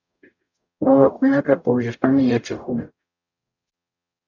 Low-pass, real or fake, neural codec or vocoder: 7.2 kHz; fake; codec, 44.1 kHz, 0.9 kbps, DAC